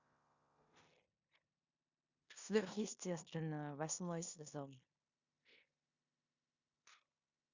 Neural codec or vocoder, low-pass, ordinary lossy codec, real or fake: codec, 16 kHz in and 24 kHz out, 0.9 kbps, LongCat-Audio-Codec, four codebook decoder; 7.2 kHz; Opus, 64 kbps; fake